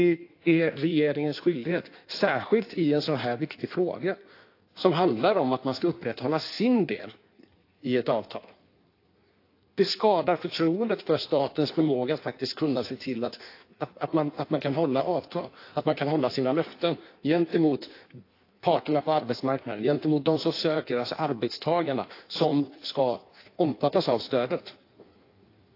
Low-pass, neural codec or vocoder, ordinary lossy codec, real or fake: 5.4 kHz; codec, 16 kHz in and 24 kHz out, 1.1 kbps, FireRedTTS-2 codec; AAC, 32 kbps; fake